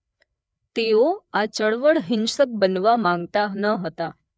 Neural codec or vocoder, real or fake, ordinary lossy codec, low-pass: codec, 16 kHz, 4 kbps, FreqCodec, larger model; fake; none; none